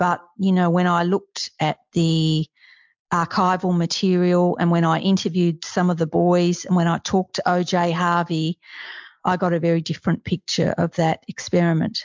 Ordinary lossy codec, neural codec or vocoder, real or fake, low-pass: MP3, 64 kbps; none; real; 7.2 kHz